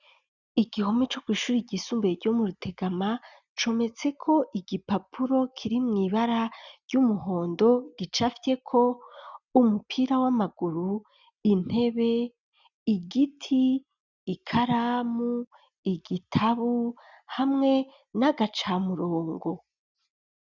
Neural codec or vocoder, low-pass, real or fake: none; 7.2 kHz; real